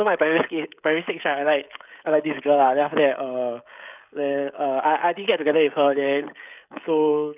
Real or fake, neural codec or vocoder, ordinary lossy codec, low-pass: fake; codec, 16 kHz, 16 kbps, FreqCodec, smaller model; none; 3.6 kHz